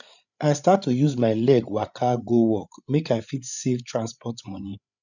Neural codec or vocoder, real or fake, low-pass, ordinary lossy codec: codec, 16 kHz, 8 kbps, FreqCodec, larger model; fake; 7.2 kHz; none